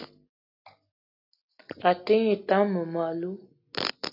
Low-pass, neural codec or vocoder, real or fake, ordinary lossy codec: 5.4 kHz; none; real; AAC, 48 kbps